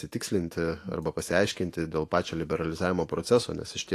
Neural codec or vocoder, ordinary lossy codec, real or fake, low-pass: autoencoder, 48 kHz, 128 numbers a frame, DAC-VAE, trained on Japanese speech; AAC, 48 kbps; fake; 14.4 kHz